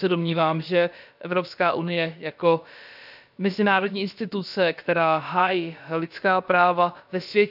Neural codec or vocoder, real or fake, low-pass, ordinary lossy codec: codec, 16 kHz, about 1 kbps, DyCAST, with the encoder's durations; fake; 5.4 kHz; none